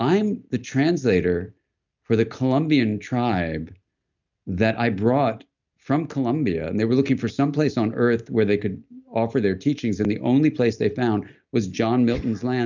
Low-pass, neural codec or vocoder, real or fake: 7.2 kHz; none; real